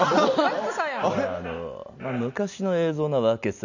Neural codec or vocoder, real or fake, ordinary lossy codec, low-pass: none; real; none; 7.2 kHz